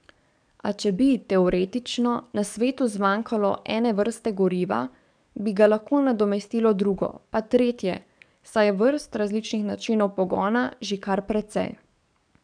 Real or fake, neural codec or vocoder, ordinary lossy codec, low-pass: fake; codec, 44.1 kHz, 7.8 kbps, DAC; none; 9.9 kHz